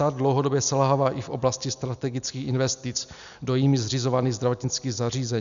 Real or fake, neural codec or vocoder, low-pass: real; none; 7.2 kHz